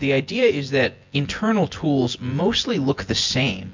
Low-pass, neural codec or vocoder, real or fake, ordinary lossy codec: 7.2 kHz; vocoder, 24 kHz, 100 mel bands, Vocos; fake; MP3, 48 kbps